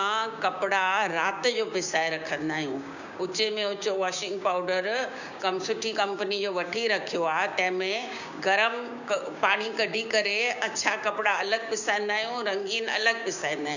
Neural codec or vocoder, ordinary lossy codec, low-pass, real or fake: codec, 16 kHz, 6 kbps, DAC; none; 7.2 kHz; fake